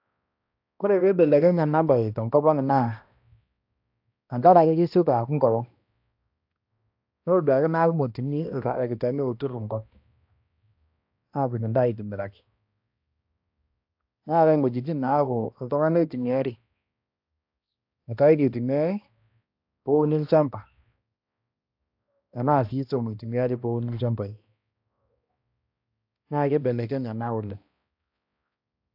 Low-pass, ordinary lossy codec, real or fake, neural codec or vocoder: 5.4 kHz; AAC, 48 kbps; fake; codec, 16 kHz, 1 kbps, X-Codec, HuBERT features, trained on balanced general audio